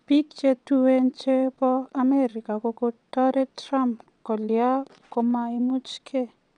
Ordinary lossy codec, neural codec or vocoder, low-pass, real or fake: none; vocoder, 22.05 kHz, 80 mel bands, WaveNeXt; 9.9 kHz; fake